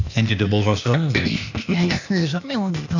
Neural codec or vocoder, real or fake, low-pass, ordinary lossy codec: codec, 16 kHz, 0.8 kbps, ZipCodec; fake; 7.2 kHz; none